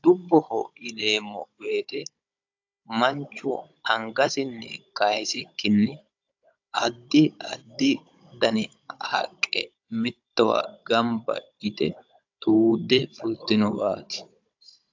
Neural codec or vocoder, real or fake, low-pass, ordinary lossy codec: codec, 16 kHz, 16 kbps, FunCodec, trained on Chinese and English, 50 frames a second; fake; 7.2 kHz; AAC, 48 kbps